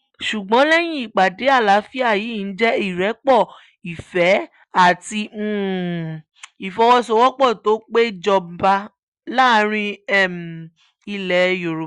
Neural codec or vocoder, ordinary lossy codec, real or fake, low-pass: none; Opus, 64 kbps; real; 10.8 kHz